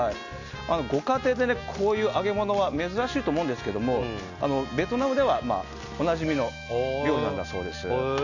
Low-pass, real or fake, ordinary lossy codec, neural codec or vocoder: 7.2 kHz; real; none; none